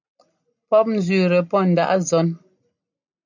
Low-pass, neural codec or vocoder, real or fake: 7.2 kHz; none; real